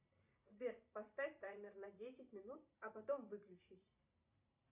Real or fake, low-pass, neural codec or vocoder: fake; 3.6 kHz; vocoder, 44.1 kHz, 128 mel bands every 512 samples, BigVGAN v2